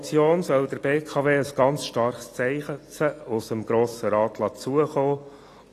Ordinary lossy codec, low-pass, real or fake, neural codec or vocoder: AAC, 48 kbps; 14.4 kHz; real; none